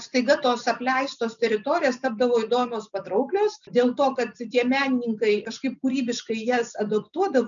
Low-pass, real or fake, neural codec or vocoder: 7.2 kHz; real; none